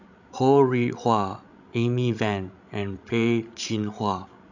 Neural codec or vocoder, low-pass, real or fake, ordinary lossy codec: codec, 16 kHz, 16 kbps, FreqCodec, larger model; 7.2 kHz; fake; none